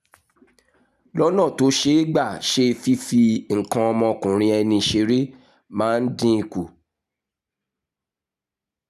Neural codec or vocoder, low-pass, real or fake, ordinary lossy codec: none; 14.4 kHz; real; none